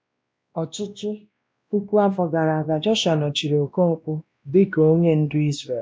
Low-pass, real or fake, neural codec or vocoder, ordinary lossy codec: none; fake; codec, 16 kHz, 1 kbps, X-Codec, WavLM features, trained on Multilingual LibriSpeech; none